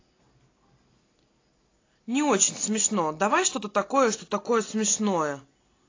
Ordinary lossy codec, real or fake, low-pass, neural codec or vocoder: AAC, 32 kbps; real; 7.2 kHz; none